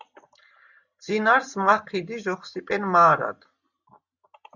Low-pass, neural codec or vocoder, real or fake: 7.2 kHz; none; real